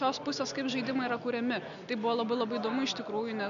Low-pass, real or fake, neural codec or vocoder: 7.2 kHz; real; none